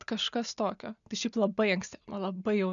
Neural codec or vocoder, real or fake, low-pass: none; real; 7.2 kHz